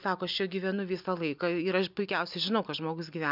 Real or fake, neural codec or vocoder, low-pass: real; none; 5.4 kHz